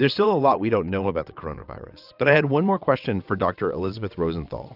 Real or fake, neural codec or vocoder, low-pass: fake; vocoder, 22.05 kHz, 80 mel bands, WaveNeXt; 5.4 kHz